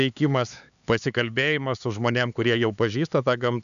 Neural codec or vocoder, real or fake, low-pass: codec, 16 kHz, 4 kbps, X-Codec, HuBERT features, trained on LibriSpeech; fake; 7.2 kHz